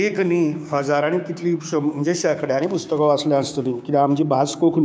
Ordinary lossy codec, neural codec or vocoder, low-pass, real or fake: none; codec, 16 kHz, 6 kbps, DAC; none; fake